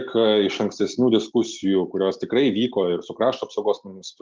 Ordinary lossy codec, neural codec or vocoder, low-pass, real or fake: Opus, 32 kbps; none; 7.2 kHz; real